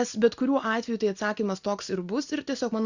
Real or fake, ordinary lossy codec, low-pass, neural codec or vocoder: real; Opus, 64 kbps; 7.2 kHz; none